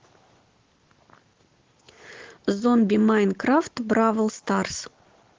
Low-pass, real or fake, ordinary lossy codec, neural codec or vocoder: 7.2 kHz; real; Opus, 16 kbps; none